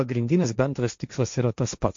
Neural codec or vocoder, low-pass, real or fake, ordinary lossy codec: codec, 16 kHz, 1.1 kbps, Voila-Tokenizer; 7.2 kHz; fake; MP3, 48 kbps